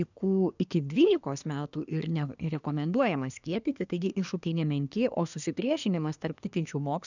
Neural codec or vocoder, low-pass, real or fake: codec, 24 kHz, 1 kbps, SNAC; 7.2 kHz; fake